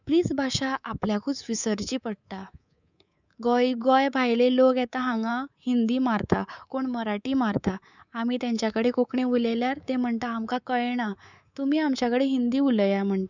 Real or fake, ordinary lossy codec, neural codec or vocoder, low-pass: fake; none; codec, 24 kHz, 3.1 kbps, DualCodec; 7.2 kHz